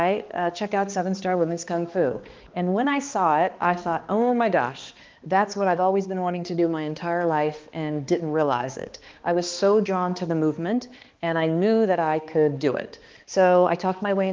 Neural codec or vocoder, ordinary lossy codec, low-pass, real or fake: codec, 16 kHz, 2 kbps, X-Codec, HuBERT features, trained on balanced general audio; Opus, 32 kbps; 7.2 kHz; fake